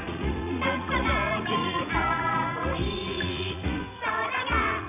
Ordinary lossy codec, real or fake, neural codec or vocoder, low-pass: none; fake; vocoder, 44.1 kHz, 80 mel bands, Vocos; 3.6 kHz